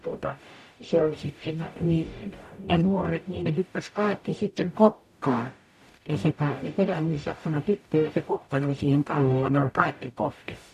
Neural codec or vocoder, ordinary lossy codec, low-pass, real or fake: codec, 44.1 kHz, 0.9 kbps, DAC; none; 14.4 kHz; fake